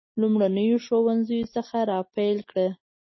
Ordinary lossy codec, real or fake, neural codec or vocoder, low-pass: MP3, 24 kbps; real; none; 7.2 kHz